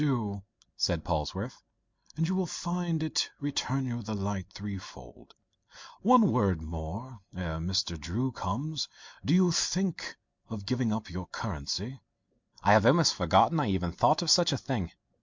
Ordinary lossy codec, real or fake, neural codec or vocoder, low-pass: MP3, 64 kbps; real; none; 7.2 kHz